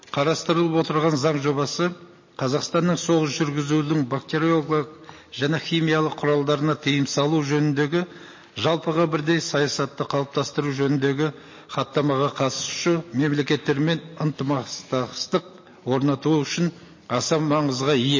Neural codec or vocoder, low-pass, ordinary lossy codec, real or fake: none; 7.2 kHz; MP3, 32 kbps; real